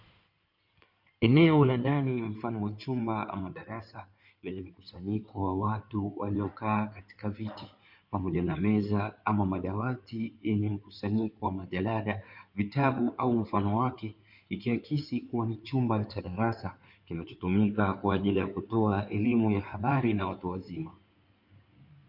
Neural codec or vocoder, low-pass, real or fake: codec, 16 kHz in and 24 kHz out, 2.2 kbps, FireRedTTS-2 codec; 5.4 kHz; fake